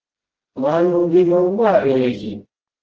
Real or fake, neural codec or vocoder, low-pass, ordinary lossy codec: fake; codec, 16 kHz, 0.5 kbps, FreqCodec, smaller model; 7.2 kHz; Opus, 16 kbps